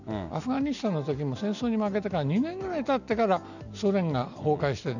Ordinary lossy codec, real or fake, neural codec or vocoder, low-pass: none; real; none; 7.2 kHz